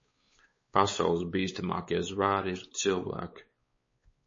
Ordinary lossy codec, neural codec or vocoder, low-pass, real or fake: MP3, 32 kbps; codec, 16 kHz, 4 kbps, X-Codec, WavLM features, trained on Multilingual LibriSpeech; 7.2 kHz; fake